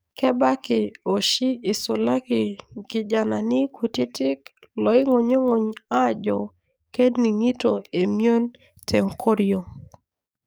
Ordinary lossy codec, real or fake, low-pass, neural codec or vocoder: none; fake; none; codec, 44.1 kHz, 7.8 kbps, DAC